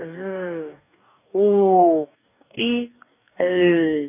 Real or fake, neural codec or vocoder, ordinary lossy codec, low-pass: fake; codec, 44.1 kHz, 2.6 kbps, DAC; none; 3.6 kHz